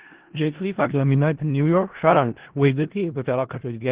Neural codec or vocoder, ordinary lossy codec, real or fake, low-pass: codec, 16 kHz in and 24 kHz out, 0.4 kbps, LongCat-Audio-Codec, four codebook decoder; Opus, 16 kbps; fake; 3.6 kHz